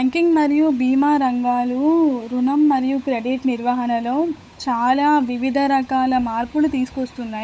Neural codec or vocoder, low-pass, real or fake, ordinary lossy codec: codec, 16 kHz, 8 kbps, FunCodec, trained on Chinese and English, 25 frames a second; none; fake; none